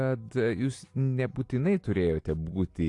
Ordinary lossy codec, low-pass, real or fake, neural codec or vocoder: AAC, 48 kbps; 10.8 kHz; real; none